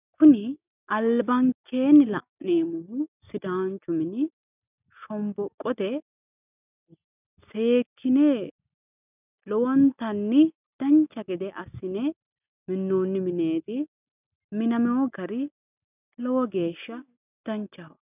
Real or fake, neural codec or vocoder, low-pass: real; none; 3.6 kHz